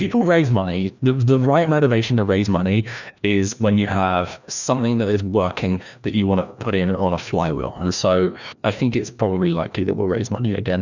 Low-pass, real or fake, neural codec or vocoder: 7.2 kHz; fake; codec, 16 kHz, 1 kbps, FreqCodec, larger model